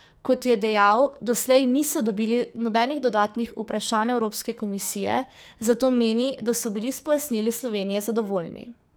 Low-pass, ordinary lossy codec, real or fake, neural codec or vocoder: none; none; fake; codec, 44.1 kHz, 2.6 kbps, SNAC